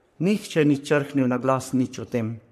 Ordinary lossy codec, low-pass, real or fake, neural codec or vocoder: MP3, 64 kbps; 14.4 kHz; fake; codec, 44.1 kHz, 3.4 kbps, Pupu-Codec